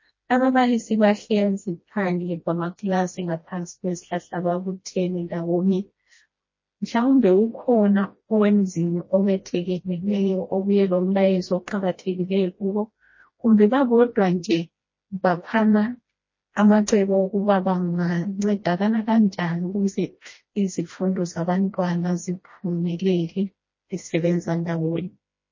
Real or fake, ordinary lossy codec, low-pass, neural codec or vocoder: fake; MP3, 32 kbps; 7.2 kHz; codec, 16 kHz, 1 kbps, FreqCodec, smaller model